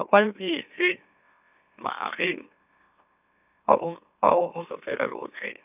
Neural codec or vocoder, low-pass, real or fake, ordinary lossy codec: autoencoder, 44.1 kHz, a latent of 192 numbers a frame, MeloTTS; 3.6 kHz; fake; none